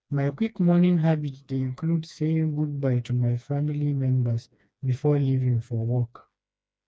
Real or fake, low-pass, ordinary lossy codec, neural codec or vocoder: fake; none; none; codec, 16 kHz, 2 kbps, FreqCodec, smaller model